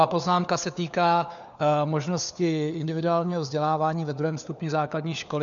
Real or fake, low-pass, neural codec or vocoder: fake; 7.2 kHz; codec, 16 kHz, 4 kbps, FunCodec, trained on LibriTTS, 50 frames a second